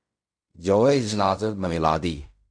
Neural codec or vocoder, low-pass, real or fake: codec, 16 kHz in and 24 kHz out, 0.4 kbps, LongCat-Audio-Codec, fine tuned four codebook decoder; 9.9 kHz; fake